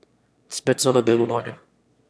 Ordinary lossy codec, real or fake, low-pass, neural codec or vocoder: none; fake; none; autoencoder, 22.05 kHz, a latent of 192 numbers a frame, VITS, trained on one speaker